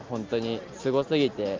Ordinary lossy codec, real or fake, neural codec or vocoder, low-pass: Opus, 32 kbps; real; none; 7.2 kHz